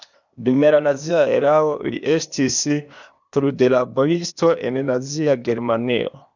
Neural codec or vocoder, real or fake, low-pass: codec, 16 kHz, 0.8 kbps, ZipCodec; fake; 7.2 kHz